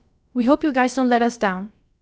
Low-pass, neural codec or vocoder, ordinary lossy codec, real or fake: none; codec, 16 kHz, about 1 kbps, DyCAST, with the encoder's durations; none; fake